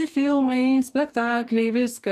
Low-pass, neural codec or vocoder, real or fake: 14.4 kHz; codec, 44.1 kHz, 2.6 kbps, DAC; fake